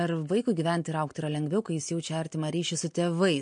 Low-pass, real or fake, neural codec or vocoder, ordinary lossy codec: 9.9 kHz; real; none; MP3, 48 kbps